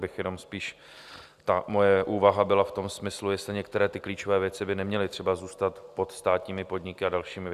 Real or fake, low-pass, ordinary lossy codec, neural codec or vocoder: real; 14.4 kHz; Opus, 64 kbps; none